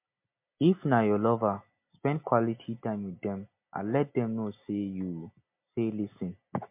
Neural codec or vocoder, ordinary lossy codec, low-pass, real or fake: none; MP3, 32 kbps; 3.6 kHz; real